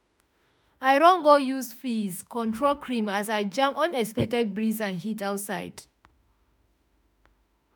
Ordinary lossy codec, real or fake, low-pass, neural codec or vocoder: none; fake; none; autoencoder, 48 kHz, 32 numbers a frame, DAC-VAE, trained on Japanese speech